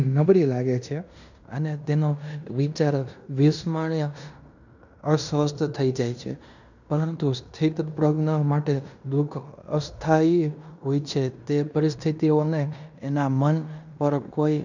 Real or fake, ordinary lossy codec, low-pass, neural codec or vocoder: fake; none; 7.2 kHz; codec, 16 kHz in and 24 kHz out, 0.9 kbps, LongCat-Audio-Codec, fine tuned four codebook decoder